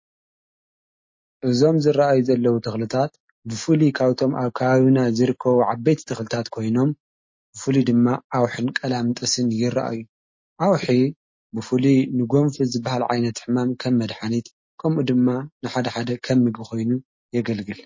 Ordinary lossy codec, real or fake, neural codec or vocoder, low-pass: MP3, 32 kbps; real; none; 7.2 kHz